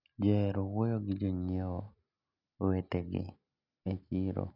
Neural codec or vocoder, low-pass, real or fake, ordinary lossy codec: none; 5.4 kHz; real; none